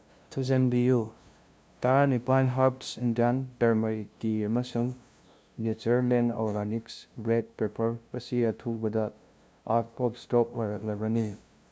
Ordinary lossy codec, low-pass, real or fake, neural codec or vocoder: none; none; fake; codec, 16 kHz, 0.5 kbps, FunCodec, trained on LibriTTS, 25 frames a second